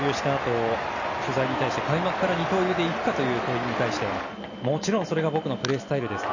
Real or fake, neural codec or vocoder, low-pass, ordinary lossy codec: real; none; 7.2 kHz; none